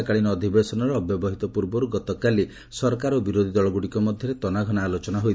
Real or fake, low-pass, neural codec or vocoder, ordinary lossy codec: real; none; none; none